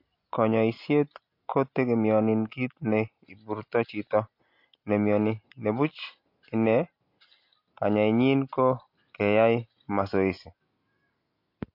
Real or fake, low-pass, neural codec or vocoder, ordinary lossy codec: real; 5.4 kHz; none; MP3, 32 kbps